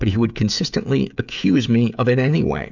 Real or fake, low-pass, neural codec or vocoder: fake; 7.2 kHz; codec, 16 kHz, 16 kbps, FreqCodec, smaller model